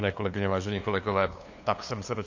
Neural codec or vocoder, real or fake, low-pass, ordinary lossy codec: codec, 16 kHz, 2 kbps, FunCodec, trained on LibriTTS, 25 frames a second; fake; 7.2 kHz; AAC, 48 kbps